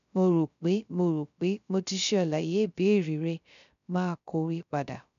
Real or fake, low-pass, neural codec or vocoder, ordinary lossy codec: fake; 7.2 kHz; codec, 16 kHz, 0.3 kbps, FocalCodec; none